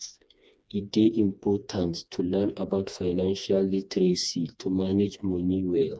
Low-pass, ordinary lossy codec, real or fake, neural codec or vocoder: none; none; fake; codec, 16 kHz, 2 kbps, FreqCodec, smaller model